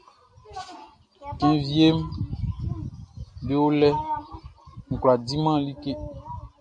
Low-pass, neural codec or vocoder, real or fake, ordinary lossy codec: 9.9 kHz; none; real; MP3, 64 kbps